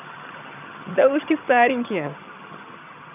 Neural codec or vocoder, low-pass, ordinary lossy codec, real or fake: vocoder, 22.05 kHz, 80 mel bands, HiFi-GAN; 3.6 kHz; none; fake